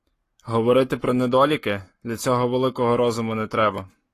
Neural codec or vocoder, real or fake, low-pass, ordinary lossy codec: codec, 44.1 kHz, 7.8 kbps, Pupu-Codec; fake; 14.4 kHz; AAC, 48 kbps